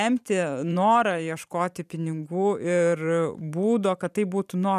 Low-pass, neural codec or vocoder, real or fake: 14.4 kHz; vocoder, 44.1 kHz, 128 mel bands every 512 samples, BigVGAN v2; fake